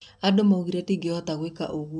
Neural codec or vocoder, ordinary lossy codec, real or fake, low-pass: none; none; real; 10.8 kHz